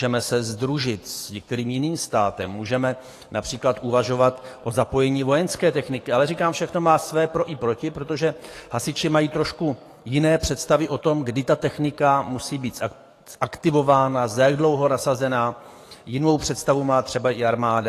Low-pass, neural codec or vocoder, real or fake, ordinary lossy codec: 14.4 kHz; codec, 44.1 kHz, 7.8 kbps, DAC; fake; AAC, 48 kbps